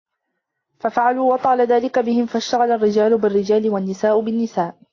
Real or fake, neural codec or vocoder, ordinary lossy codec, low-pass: real; none; AAC, 32 kbps; 7.2 kHz